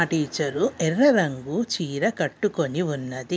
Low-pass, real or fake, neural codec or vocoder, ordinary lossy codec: none; real; none; none